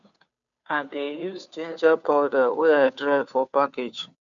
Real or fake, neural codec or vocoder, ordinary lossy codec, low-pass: fake; codec, 16 kHz, 2 kbps, FunCodec, trained on Chinese and English, 25 frames a second; none; 7.2 kHz